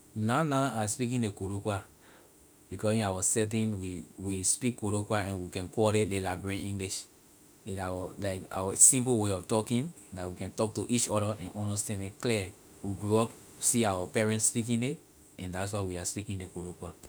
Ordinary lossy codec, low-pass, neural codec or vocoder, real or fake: none; none; autoencoder, 48 kHz, 32 numbers a frame, DAC-VAE, trained on Japanese speech; fake